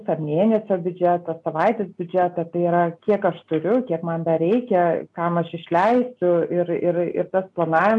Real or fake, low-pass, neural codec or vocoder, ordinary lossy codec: real; 10.8 kHz; none; AAC, 64 kbps